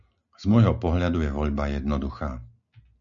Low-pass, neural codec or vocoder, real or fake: 7.2 kHz; none; real